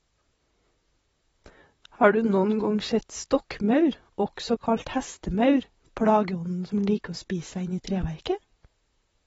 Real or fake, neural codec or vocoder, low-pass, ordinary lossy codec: fake; vocoder, 44.1 kHz, 128 mel bands, Pupu-Vocoder; 19.8 kHz; AAC, 24 kbps